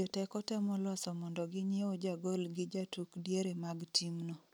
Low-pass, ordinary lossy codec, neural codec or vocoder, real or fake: none; none; none; real